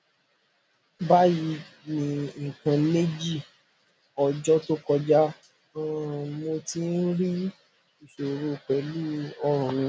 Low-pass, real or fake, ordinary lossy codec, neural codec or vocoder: none; real; none; none